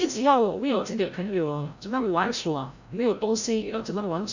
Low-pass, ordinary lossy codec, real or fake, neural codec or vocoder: 7.2 kHz; none; fake; codec, 16 kHz, 0.5 kbps, FreqCodec, larger model